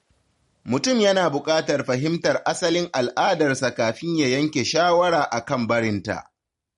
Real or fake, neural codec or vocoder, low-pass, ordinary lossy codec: real; none; 19.8 kHz; MP3, 48 kbps